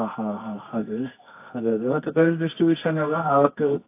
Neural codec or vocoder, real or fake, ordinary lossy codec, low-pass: codec, 24 kHz, 0.9 kbps, WavTokenizer, medium music audio release; fake; AAC, 24 kbps; 3.6 kHz